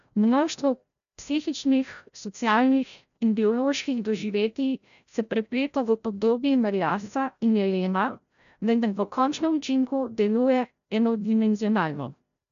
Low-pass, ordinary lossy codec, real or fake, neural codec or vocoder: 7.2 kHz; none; fake; codec, 16 kHz, 0.5 kbps, FreqCodec, larger model